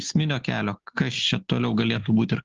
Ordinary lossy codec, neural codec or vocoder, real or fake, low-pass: Opus, 16 kbps; none; real; 7.2 kHz